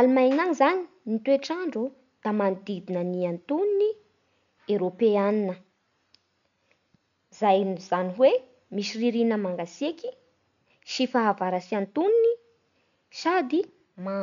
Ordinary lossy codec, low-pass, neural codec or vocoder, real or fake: none; 7.2 kHz; none; real